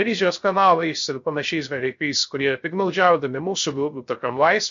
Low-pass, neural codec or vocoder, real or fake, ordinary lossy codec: 7.2 kHz; codec, 16 kHz, 0.3 kbps, FocalCodec; fake; MP3, 48 kbps